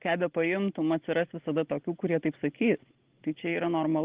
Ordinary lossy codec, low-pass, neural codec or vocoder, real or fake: Opus, 16 kbps; 3.6 kHz; none; real